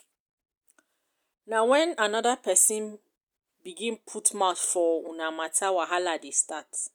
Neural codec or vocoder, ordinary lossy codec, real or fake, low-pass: none; none; real; none